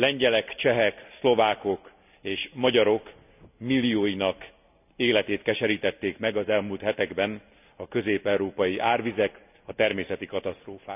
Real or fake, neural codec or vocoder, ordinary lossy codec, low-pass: real; none; none; 3.6 kHz